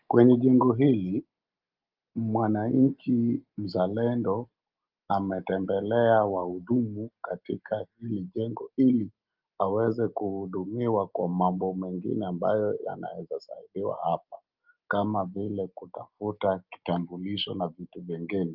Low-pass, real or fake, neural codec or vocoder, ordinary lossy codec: 5.4 kHz; real; none; Opus, 32 kbps